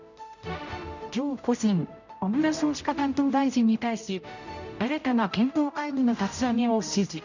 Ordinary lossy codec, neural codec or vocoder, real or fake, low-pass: none; codec, 16 kHz, 0.5 kbps, X-Codec, HuBERT features, trained on general audio; fake; 7.2 kHz